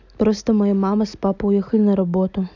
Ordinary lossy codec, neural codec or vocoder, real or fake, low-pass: none; none; real; 7.2 kHz